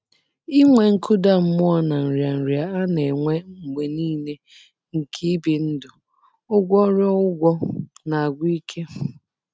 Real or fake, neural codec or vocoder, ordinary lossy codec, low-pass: real; none; none; none